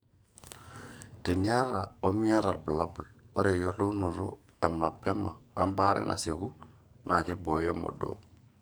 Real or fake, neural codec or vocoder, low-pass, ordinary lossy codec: fake; codec, 44.1 kHz, 2.6 kbps, SNAC; none; none